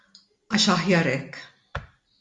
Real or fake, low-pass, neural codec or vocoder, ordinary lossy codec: real; 10.8 kHz; none; MP3, 64 kbps